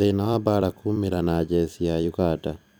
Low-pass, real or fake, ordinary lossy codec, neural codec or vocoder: none; fake; none; vocoder, 44.1 kHz, 128 mel bands every 256 samples, BigVGAN v2